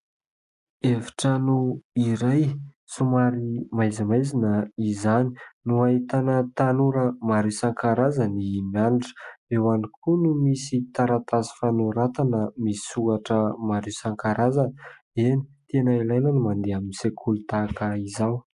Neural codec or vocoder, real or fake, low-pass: none; real; 10.8 kHz